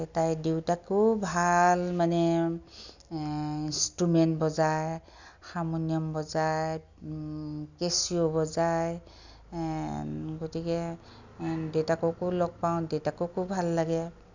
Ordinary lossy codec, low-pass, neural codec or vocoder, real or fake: none; 7.2 kHz; none; real